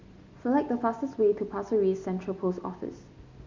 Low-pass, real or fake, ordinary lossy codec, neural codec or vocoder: 7.2 kHz; real; MP3, 64 kbps; none